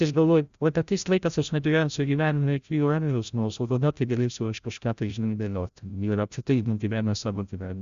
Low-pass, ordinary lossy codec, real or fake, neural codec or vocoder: 7.2 kHz; Opus, 64 kbps; fake; codec, 16 kHz, 0.5 kbps, FreqCodec, larger model